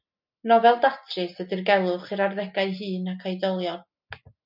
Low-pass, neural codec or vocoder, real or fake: 5.4 kHz; none; real